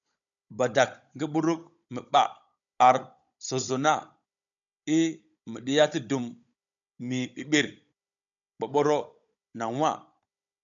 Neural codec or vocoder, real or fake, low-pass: codec, 16 kHz, 16 kbps, FunCodec, trained on Chinese and English, 50 frames a second; fake; 7.2 kHz